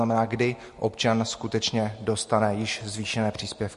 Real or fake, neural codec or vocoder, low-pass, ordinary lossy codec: real; none; 14.4 kHz; MP3, 48 kbps